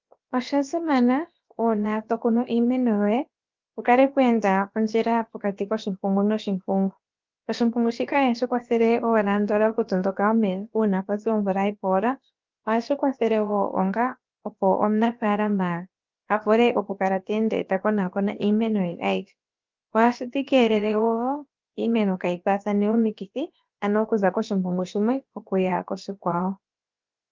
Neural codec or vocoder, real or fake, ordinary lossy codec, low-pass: codec, 16 kHz, about 1 kbps, DyCAST, with the encoder's durations; fake; Opus, 32 kbps; 7.2 kHz